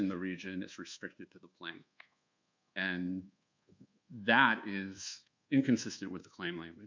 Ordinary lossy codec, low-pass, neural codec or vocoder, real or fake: MP3, 64 kbps; 7.2 kHz; codec, 24 kHz, 1.2 kbps, DualCodec; fake